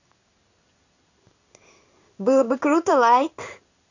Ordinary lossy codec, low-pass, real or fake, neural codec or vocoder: none; 7.2 kHz; fake; codec, 16 kHz in and 24 kHz out, 1 kbps, XY-Tokenizer